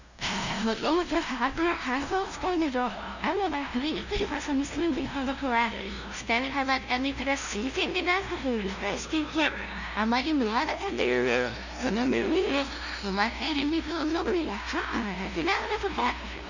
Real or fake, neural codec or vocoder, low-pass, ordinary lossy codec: fake; codec, 16 kHz, 0.5 kbps, FunCodec, trained on LibriTTS, 25 frames a second; 7.2 kHz; none